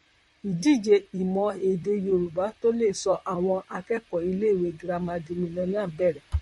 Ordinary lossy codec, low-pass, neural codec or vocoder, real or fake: MP3, 48 kbps; 19.8 kHz; vocoder, 44.1 kHz, 128 mel bands, Pupu-Vocoder; fake